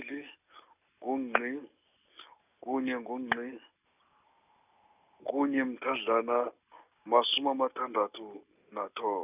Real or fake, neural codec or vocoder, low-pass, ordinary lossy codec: real; none; 3.6 kHz; none